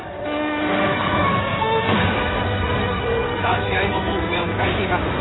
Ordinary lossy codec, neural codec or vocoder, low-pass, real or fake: AAC, 16 kbps; codec, 16 kHz in and 24 kHz out, 2.2 kbps, FireRedTTS-2 codec; 7.2 kHz; fake